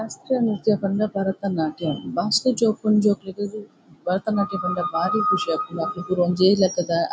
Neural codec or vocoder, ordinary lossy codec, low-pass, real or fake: none; none; none; real